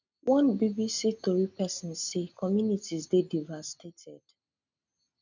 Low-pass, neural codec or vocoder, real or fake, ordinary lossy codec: 7.2 kHz; none; real; none